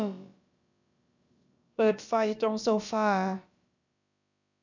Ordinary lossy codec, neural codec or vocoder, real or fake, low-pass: none; codec, 16 kHz, about 1 kbps, DyCAST, with the encoder's durations; fake; 7.2 kHz